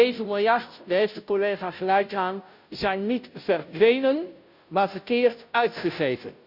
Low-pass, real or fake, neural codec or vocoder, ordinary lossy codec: 5.4 kHz; fake; codec, 16 kHz, 0.5 kbps, FunCodec, trained on Chinese and English, 25 frames a second; none